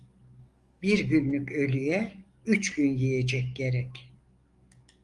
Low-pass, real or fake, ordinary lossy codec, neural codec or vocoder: 10.8 kHz; fake; Opus, 32 kbps; codec, 44.1 kHz, 7.8 kbps, DAC